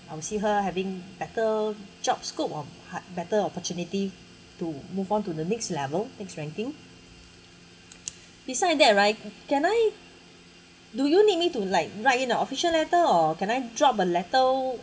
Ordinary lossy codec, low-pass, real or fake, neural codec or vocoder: none; none; real; none